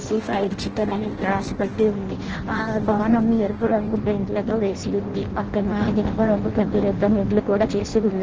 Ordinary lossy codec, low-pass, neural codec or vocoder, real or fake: Opus, 16 kbps; 7.2 kHz; codec, 16 kHz in and 24 kHz out, 0.6 kbps, FireRedTTS-2 codec; fake